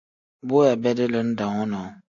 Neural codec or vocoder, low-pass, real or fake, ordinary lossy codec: none; 7.2 kHz; real; AAC, 48 kbps